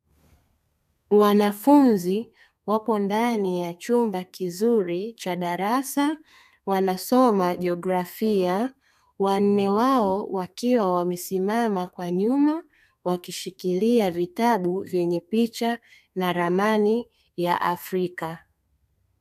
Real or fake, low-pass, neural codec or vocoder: fake; 14.4 kHz; codec, 32 kHz, 1.9 kbps, SNAC